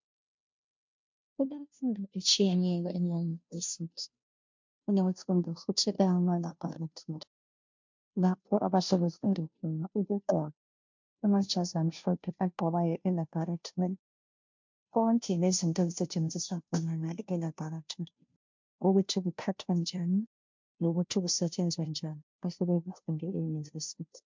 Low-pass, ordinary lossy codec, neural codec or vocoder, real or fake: 7.2 kHz; MP3, 64 kbps; codec, 16 kHz, 0.5 kbps, FunCodec, trained on Chinese and English, 25 frames a second; fake